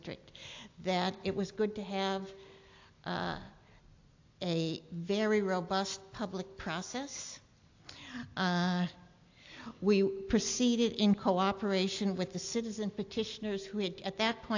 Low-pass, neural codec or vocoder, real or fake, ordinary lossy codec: 7.2 kHz; none; real; AAC, 48 kbps